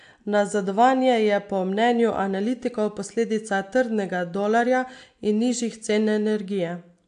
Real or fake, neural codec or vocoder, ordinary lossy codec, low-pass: real; none; AAC, 64 kbps; 9.9 kHz